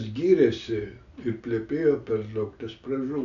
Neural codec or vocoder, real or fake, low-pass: none; real; 7.2 kHz